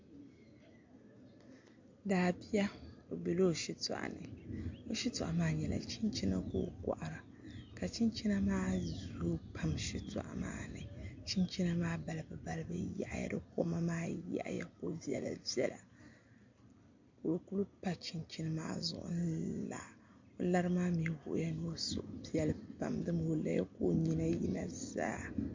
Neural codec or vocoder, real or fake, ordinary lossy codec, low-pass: none; real; MP3, 48 kbps; 7.2 kHz